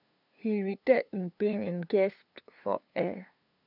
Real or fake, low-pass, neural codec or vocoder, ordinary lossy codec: fake; 5.4 kHz; codec, 16 kHz, 2 kbps, FunCodec, trained on LibriTTS, 25 frames a second; none